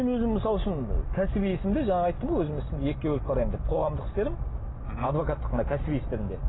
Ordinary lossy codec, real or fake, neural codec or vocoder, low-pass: AAC, 16 kbps; real; none; 7.2 kHz